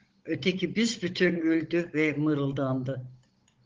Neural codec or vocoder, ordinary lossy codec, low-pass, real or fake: codec, 16 kHz, 16 kbps, FunCodec, trained on Chinese and English, 50 frames a second; Opus, 16 kbps; 7.2 kHz; fake